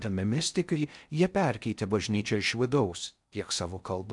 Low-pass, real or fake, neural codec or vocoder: 10.8 kHz; fake; codec, 16 kHz in and 24 kHz out, 0.6 kbps, FocalCodec, streaming, 4096 codes